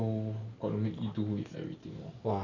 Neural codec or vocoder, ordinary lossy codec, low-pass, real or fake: none; none; 7.2 kHz; real